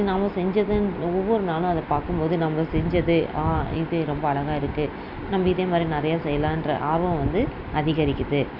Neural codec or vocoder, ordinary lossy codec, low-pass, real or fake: none; none; 5.4 kHz; real